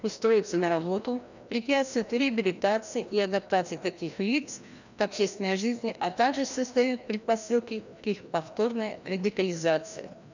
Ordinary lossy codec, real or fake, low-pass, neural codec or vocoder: none; fake; 7.2 kHz; codec, 16 kHz, 1 kbps, FreqCodec, larger model